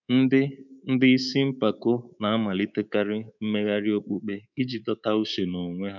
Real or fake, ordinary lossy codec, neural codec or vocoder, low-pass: fake; none; codec, 24 kHz, 3.1 kbps, DualCodec; 7.2 kHz